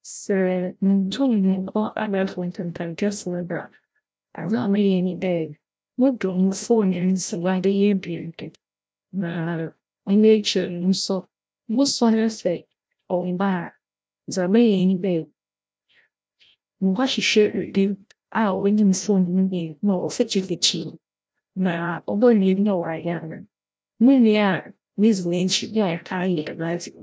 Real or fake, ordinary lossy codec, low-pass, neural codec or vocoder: fake; none; none; codec, 16 kHz, 0.5 kbps, FreqCodec, larger model